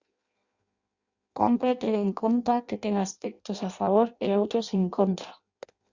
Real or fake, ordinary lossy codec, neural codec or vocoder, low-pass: fake; Opus, 64 kbps; codec, 16 kHz in and 24 kHz out, 0.6 kbps, FireRedTTS-2 codec; 7.2 kHz